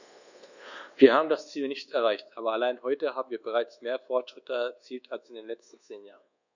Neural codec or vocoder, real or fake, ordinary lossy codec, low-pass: codec, 24 kHz, 1.2 kbps, DualCodec; fake; none; 7.2 kHz